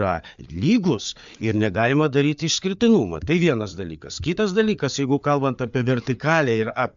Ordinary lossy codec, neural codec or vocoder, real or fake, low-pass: MP3, 64 kbps; codec, 16 kHz, 4 kbps, FreqCodec, larger model; fake; 7.2 kHz